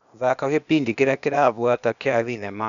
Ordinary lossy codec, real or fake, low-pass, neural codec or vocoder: none; fake; 7.2 kHz; codec, 16 kHz, 0.8 kbps, ZipCodec